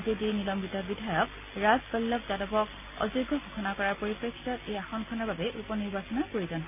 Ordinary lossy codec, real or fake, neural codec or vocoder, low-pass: none; real; none; 3.6 kHz